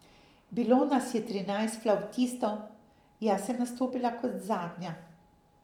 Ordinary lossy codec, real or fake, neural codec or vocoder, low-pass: none; fake; vocoder, 44.1 kHz, 128 mel bands every 512 samples, BigVGAN v2; 19.8 kHz